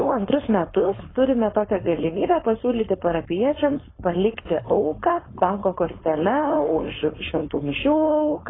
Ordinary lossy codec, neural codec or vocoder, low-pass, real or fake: AAC, 16 kbps; codec, 16 kHz, 4.8 kbps, FACodec; 7.2 kHz; fake